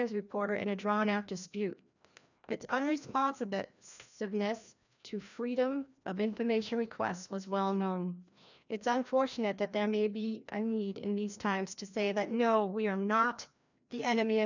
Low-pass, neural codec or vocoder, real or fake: 7.2 kHz; codec, 16 kHz, 1 kbps, FreqCodec, larger model; fake